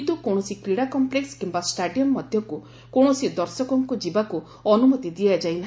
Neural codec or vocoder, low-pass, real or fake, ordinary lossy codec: none; none; real; none